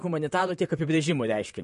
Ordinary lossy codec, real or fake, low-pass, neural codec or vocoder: MP3, 48 kbps; fake; 14.4 kHz; vocoder, 44.1 kHz, 128 mel bands, Pupu-Vocoder